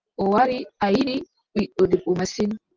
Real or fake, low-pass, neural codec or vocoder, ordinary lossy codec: real; 7.2 kHz; none; Opus, 32 kbps